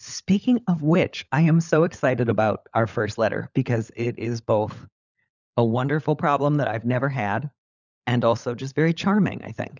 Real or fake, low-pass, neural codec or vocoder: fake; 7.2 kHz; codec, 16 kHz, 16 kbps, FunCodec, trained on LibriTTS, 50 frames a second